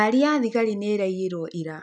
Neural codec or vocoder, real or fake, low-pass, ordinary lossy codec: none; real; 10.8 kHz; none